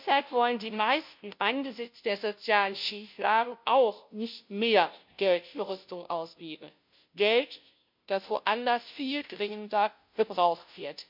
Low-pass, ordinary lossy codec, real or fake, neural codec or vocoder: 5.4 kHz; MP3, 48 kbps; fake; codec, 16 kHz, 0.5 kbps, FunCodec, trained on Chinese and English, 25 frames a second